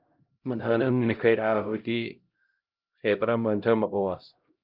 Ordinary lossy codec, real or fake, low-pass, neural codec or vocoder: Opus, 24 kbps; fake; 5.4 kHz; codec, 16 kHz, 0.5 kbps, X-Codec, HuBERT features, trained on LibriSpeech